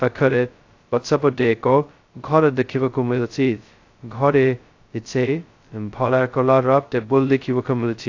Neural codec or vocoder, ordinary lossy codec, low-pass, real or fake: codec, 16 kHz, 0.2 kbps, FocalCodec; AAC, 48 kbps; 7.2 kHz; fake